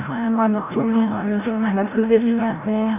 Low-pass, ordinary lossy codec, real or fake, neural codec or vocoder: 3.6 kHz; none; fake; codec, 16 kHz, 0.5 kbps, FreqCodec, larger model